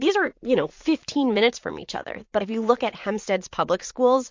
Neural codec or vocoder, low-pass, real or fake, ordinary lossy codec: vocoder, 44.1 kHz, 80 mel bands, Vocos; 7.2 kHz; fake; MP3, 48 kbps